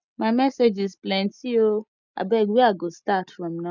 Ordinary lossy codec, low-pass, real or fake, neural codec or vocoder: none; 7.2 kHz; real; none